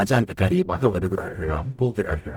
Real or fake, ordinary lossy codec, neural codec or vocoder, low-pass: fake; none; codec, 44.1 kHz, 0.9 kbps, DAC; 19.8 kHz